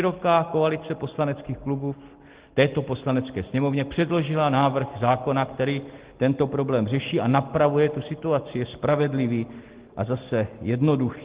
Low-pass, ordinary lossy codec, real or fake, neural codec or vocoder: 3.6 kHz; Opus, 16 kbps; real; none